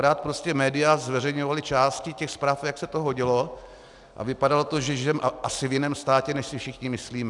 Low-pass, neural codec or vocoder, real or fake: 10.8 kHz; none; real